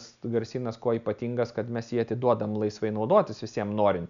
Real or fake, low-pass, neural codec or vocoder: real; 7.2 kHz; none